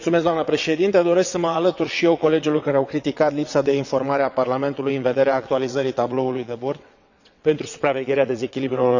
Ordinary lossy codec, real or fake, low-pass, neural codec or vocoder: none; fake; 7.2 kHz; vocoder, 22.05 kHz, 80 mel bands, WaveNeXt